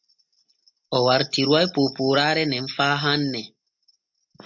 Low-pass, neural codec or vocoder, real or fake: 7.2 kHz; none; real